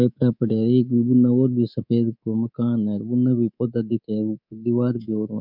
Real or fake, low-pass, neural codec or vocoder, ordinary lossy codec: fake; 5.4 kHz; codec, 24 kHz, 3.1 kbps, DualCodec; none